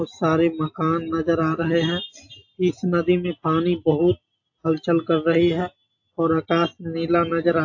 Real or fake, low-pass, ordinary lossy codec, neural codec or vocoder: real; 7.2 kHz; AAC, 48 kbps; none